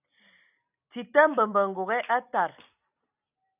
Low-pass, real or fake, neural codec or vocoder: 3.6 kHz; real; none